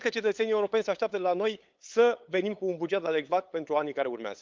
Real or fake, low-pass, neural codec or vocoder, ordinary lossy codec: fake; 7.2 kHz; codec, 16 kHz, 8 kbps, FunCodec, trained on LibriTTS, 25 frames a second; Opus, 24 kbps